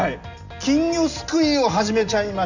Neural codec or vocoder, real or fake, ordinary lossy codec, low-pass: none; real; none; 7.2 kHz